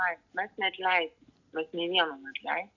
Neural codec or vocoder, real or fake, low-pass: none; real; 7.2 kHz